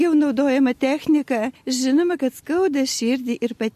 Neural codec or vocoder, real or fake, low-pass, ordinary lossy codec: none; real; 14.4 kHz; MP3, 64 kbps